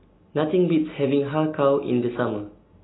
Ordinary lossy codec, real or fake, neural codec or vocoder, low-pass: AAC, 16 kbps; real; none; 7.2 kHz